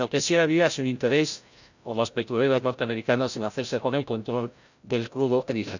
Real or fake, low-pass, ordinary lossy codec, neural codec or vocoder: fake; 7.2 kHz; none; codec, 16 kHz, 0.5 kbps, FreqCodec, larger model